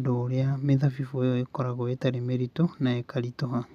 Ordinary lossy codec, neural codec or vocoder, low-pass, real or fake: none; none; 14.4 kHz; real